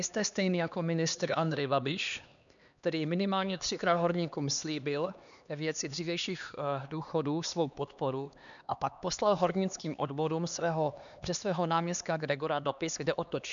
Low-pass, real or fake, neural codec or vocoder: 7.2 kHz; fake; codec, 16 kHz, 2 kbps, X-Codec, HuBERT features, trained on LibriSpeech